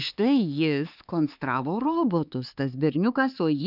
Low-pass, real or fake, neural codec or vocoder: 5.4 kHz; fake; codec, 16 kHz, 4 kbps, X-Codec, HuBERT features, trained on LibriSpeech